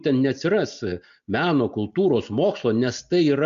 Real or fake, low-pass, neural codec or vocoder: real; 7.2 kHz; none